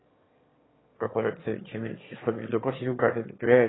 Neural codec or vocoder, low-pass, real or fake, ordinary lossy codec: autoencoder, 22.05 kHz, a latent of 192 numbers a frame, VITS, trained on one speaker; 7.2 kHz; fake; AAC, 16 kbps